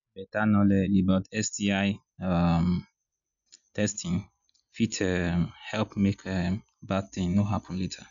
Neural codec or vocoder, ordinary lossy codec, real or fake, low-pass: none; none; real; 7.2 kHz